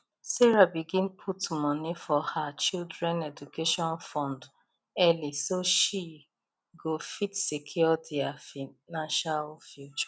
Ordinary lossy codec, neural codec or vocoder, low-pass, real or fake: none; none; none; real